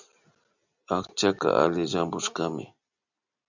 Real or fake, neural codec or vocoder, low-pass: real; none; 7.2 kHz